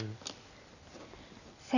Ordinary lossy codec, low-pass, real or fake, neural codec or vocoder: none; 7.2 kHz; real; none